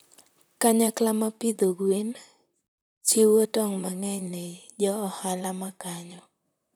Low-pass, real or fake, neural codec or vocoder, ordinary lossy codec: none; fake; vocoder, 44.1 kHz, 128 mel bands, Pupu-Vocoder; none